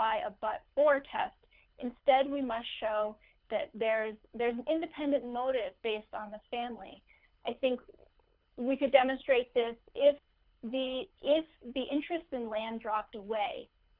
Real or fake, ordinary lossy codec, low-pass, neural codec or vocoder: fake; Opus, 64 kbps; 5.4 kHz; codec, 24 kHz, 6 kbps, HILCodec